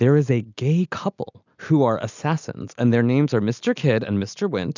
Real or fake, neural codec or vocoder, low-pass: real; none; 7.2 kHz